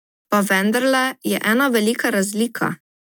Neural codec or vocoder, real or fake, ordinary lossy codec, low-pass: none; real; none; none